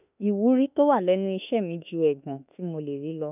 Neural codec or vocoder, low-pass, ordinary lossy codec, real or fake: autoencoder, 48 kHz, 32 numbers a frame, DAC-VAE, trained on Japanese speech; 3.6 kHz; none; fake